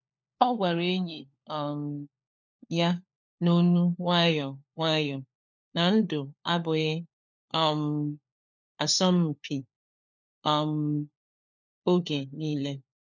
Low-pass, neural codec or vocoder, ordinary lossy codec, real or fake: 7.2 kHz; codec, 16 kHz, 4 kbps, FunCodec, trained on LibriTTS, 50 frames a second; none; fake